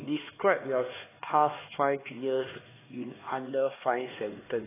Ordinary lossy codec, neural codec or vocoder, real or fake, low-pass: AAC, 16 kbps; codec, 16 kHz, 2 kbps, X-Codec, HuBERT features, trained on LibriSpeech; fake; 3.6 kHz